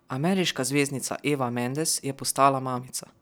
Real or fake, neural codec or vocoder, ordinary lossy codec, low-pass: real; none; none; none